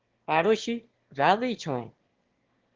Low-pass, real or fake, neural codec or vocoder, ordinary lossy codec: 7.2 kHz; fake; autoencoder, 22.05 kHz, a latent of 192 numbers a frame, VITS, trained on one speaker; Opus, 16 kbps